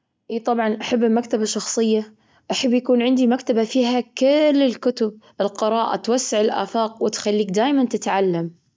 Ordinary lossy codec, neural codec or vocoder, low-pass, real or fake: none; none; none; real